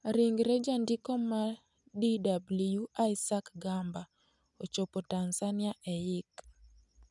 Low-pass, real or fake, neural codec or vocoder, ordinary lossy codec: 10.8 kHz; real; none; none